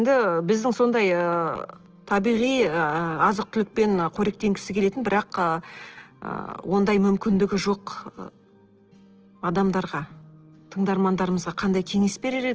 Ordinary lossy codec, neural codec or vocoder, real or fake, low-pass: Opus, 16 kbps; none; real; 7.2 kHz